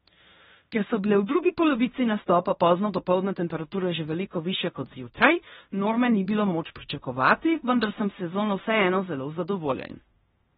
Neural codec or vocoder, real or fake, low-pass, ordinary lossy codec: codec, 16 kHz in and 24 kHz out, 0.9 kbps, LongCat-Audio-Codec, fine tuned four codebook decoder; fake; 10.8 kHz; AAC, 16 kbps